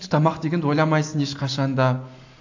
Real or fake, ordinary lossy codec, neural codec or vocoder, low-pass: real; AAC, 48 kbps; none; 7.2 kHz